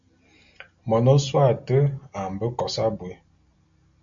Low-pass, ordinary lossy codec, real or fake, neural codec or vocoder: 7.2 kHz; MP3, 64 kbps; real; none